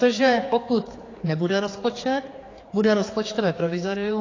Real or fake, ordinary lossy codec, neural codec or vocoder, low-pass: fake; AAC, 32 kbps; codec, 16 kHz, 4 kbps, X-Codec, HuBERT features, trained on general audio; 7.2 kHz